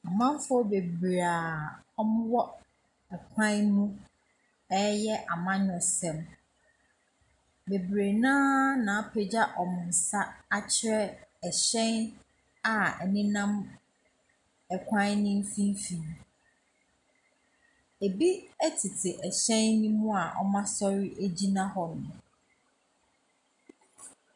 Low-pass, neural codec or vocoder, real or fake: 10.8 kHz; none; real